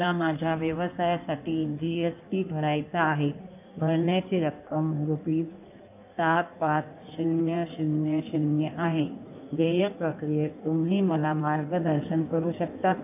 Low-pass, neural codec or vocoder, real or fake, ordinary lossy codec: 3.6 kHz; codec, 16 kHz in and 24 kHz out, 1.1 kbps, FireRedTTS-2 codec; fake; none